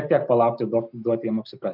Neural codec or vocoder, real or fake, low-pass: none; real; 5.4 kHz